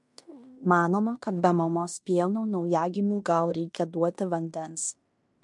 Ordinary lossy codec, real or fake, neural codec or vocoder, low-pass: MP3, 64 kbps; fake; codec, 16 kHz in and 24 kHz out, 0.9 kbps, LongCat-Audio-Codec, fine tuned four codebook decoder; 10.8 kHz